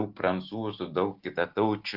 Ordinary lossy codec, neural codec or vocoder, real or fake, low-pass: Opus, 16 kbps; none; real; 5.4 kHz